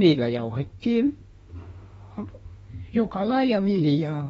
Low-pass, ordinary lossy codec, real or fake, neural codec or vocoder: 19.8 kHz; AAC, 24 kbps; fake; autoencoder, 48 kHz, 32 numbers a frame, DAC-VAE, trained on Japanese speech